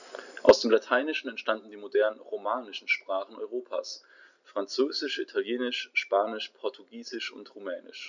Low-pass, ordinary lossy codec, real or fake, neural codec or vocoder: 7.2 kHz; none; real; none